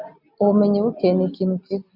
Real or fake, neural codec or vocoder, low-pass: real; none; 5.4 kHz